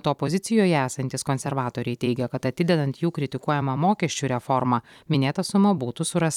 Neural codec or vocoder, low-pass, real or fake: vocoder, 44.1 kHz, 128 mel bands every 256 samples, BigVGAN v2; 19.8 kHz; fake